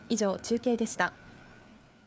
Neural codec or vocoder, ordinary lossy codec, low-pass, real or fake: codec, 16 kHz, 4 kbps, FreqCodec, larger model; none; none; fake